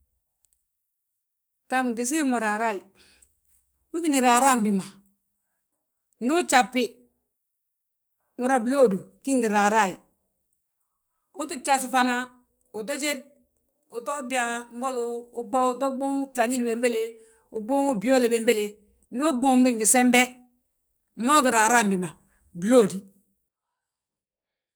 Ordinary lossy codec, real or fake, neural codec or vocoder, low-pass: none; fake; codec, 44.1 kHz, 2.6 kbps, SNAC; none